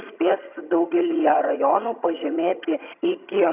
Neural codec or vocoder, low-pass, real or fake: vocoder, 22.05 kHz, 80 mel bands, HiFi-GAN; 3.6 kHz; fake